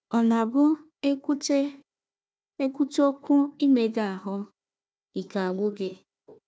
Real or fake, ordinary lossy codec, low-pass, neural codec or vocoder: fake; none; none; codec, 16 kHz, 1 kbps, FunCodec, trained on Chinese and English, 50 frames a second